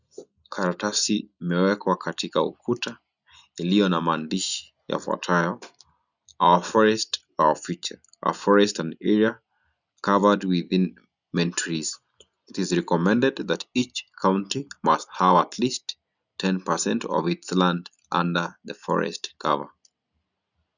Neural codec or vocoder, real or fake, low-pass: none; real; 7.2 kHz